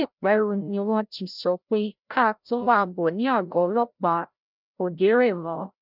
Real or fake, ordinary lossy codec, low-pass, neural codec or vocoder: fake; none; 5.4 kHz; codec, 16 kHz, 0.5 kbps, FreqCodec, larger model